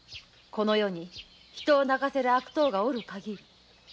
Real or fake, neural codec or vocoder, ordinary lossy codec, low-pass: real; none; none; none